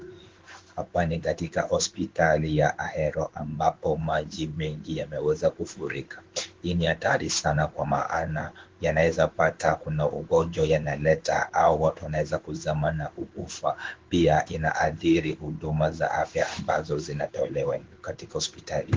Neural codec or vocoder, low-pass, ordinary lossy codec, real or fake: codec, 16 kHz in and 24 kHz out, 1 kbps, XY-Tokenizer; 7.2 kHz; Opus, 16 kbps; fake